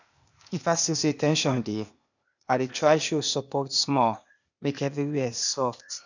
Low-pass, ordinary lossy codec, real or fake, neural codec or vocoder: 7.2 kHz; none; fake; codec, 16 kHz, 0.8 kbps, ZipCodec